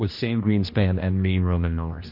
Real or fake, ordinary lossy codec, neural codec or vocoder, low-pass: fake; MP3, 32 kbps; codec, 16 kHz, 1 kbps, X-Codec, HuBERT features, trained on general audio; 5.4 kHz